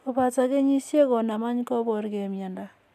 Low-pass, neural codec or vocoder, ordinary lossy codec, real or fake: 14.4 kHz; none; none; real